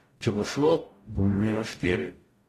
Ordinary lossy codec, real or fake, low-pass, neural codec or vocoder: AAC, 48 kbps; fake; 14.4 kHz; codec, 44.1 kHz, 0.9 kbps, DAC